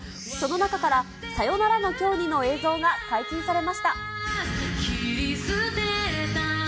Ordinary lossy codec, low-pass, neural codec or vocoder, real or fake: none; none; none; real